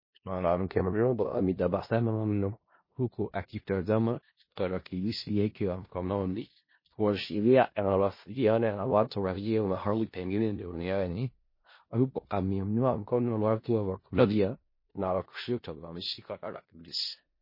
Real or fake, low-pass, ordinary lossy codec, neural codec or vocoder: fake; 5.4 kHz; MP3, 24 kbps; codec, 16 kHz in and 24 kHz out, 0.4 kbps, LongCat-Audio-Codec, four codebook decoder